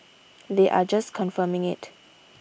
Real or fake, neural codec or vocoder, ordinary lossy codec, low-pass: real; none; none; none